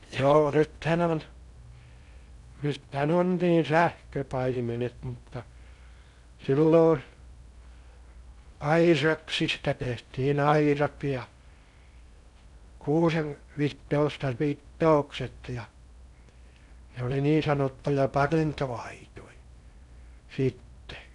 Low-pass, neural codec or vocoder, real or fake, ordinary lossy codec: 10.8 kHz; codec, 16 kHz in and 24 kHz out, 0.6 kbps, FocalCodec, streaming, 4096 codes; fake; none